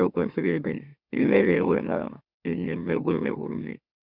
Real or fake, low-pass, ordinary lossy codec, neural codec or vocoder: fake; 5.4 kHz; none; autoencoder, 44.1 kHz, a latent of 192 numbers a frame, MeloTTS